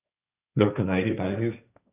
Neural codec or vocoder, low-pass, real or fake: codec, 16 kHz, 1.1 kbps, Voila-Tokenizer; 3.6 kHz; fake